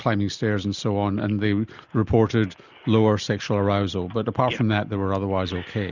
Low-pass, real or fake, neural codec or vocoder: 7.2 kHz; real; none